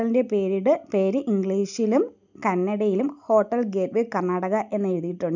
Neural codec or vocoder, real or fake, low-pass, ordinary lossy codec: none; real; 7.2 kHz; none